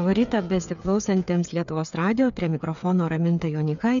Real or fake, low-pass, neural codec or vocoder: fake; 7.2 kHz; codec, 16 kHz, 8 kbps, FreqCodec, smaller model